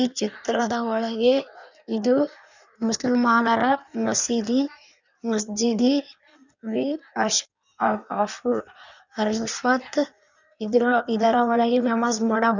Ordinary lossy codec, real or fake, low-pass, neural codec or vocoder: none; fake; 7.2 kHz; codec, 16 kHz in and 24 kHz out, 1.1 kbps, FireRedTTS-2 codec